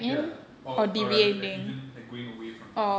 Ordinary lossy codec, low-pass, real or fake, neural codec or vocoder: none; none; real; none